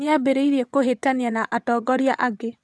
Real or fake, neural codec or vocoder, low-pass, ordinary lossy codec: fake; vocoder, 22.05 kHz, 80 mel bands, WaveNeXt; none; none